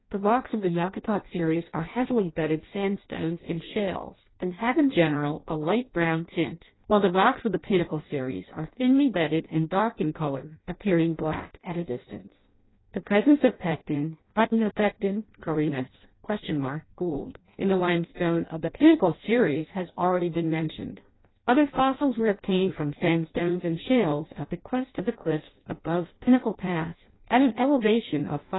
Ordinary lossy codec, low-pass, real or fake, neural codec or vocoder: AAC, 16 kbps; 7.2 kHz; fake; codec, 16 kHz in and 24 kHz out, 0.6 kbps, FireRedTTS-2 codec